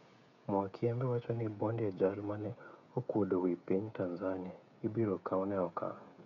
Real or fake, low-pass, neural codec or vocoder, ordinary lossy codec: fake; 7.2 kHz; codec, 16 kHz, 16 kbps, FreqCodec, smaller model; none